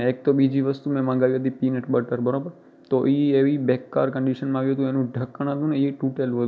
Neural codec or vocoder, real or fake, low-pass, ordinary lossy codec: none; real; none; none